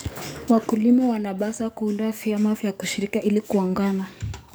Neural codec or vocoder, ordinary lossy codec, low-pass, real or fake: codec, 44.1 kHz, 7.8 kbps, DAC; none; none; fake